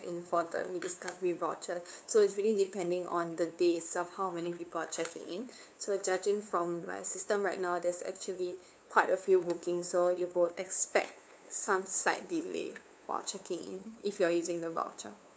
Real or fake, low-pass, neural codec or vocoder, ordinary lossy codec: fake; none; codec, 16 kHz, 2 kbps, FunCodec, trained on LibriTTS, 25 frames a second; none